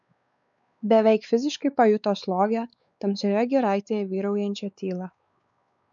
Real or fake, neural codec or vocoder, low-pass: fake; codec, 16 kHz, 4 kbps, X-Codec, WavLM features, trained on Multilingual LibriSpeech; 7.2 kHz